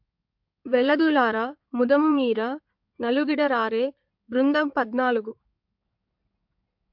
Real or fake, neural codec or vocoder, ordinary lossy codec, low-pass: fake; codec, 16 kHz in and 24 kHz out, 2.2 kbps, FireRedTTS-2 codec; MP3, 48 kbps; 5.4 kHz